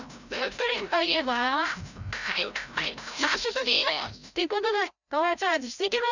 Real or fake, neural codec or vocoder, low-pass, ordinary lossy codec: fake; codec, 16 kHz, 0.5 kbps, FreqCodec, larger model; 7.2 kHz; none